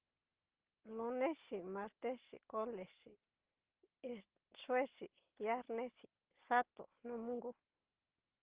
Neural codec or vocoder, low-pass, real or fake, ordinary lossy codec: none; 3.6 kHz; real; Opus, 16 kbps